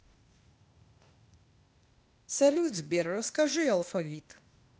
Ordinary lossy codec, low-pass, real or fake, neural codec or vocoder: none; none; fake; codec, 16 kHz, 0.8 kbps, ZipCodec